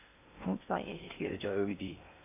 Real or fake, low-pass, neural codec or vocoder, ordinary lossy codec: fake; 3.6 kHz; codec, 16 kHz in and 24 kHz out, 0.6 kbps, FocalCodec, streaming, 2048 codes; none